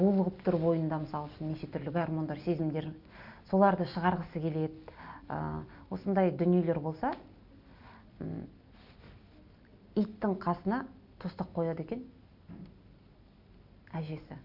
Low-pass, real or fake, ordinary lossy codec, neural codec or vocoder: 5.4 kHz; real; none; none